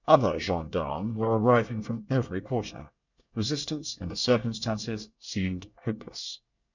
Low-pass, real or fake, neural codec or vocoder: 7.2 kHz; fake; codec, 24 kHz, 1 kbps, SNAC